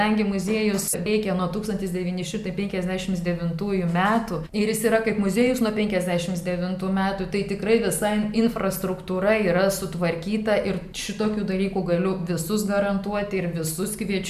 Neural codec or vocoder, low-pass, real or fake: none; 14.4 kHz; real